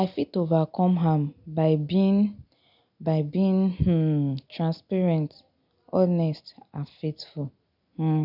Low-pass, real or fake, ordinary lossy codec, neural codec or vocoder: 5.4 kHz; real; none; none